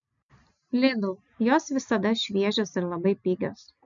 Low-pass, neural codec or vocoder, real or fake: 7.2 kHz; none; real